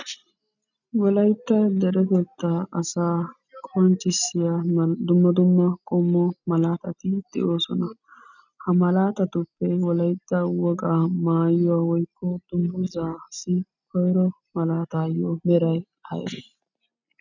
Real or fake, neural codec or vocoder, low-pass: real; none; 7.2 kHz